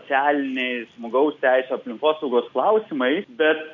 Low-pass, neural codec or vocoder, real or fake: 7.2 kHz; none; real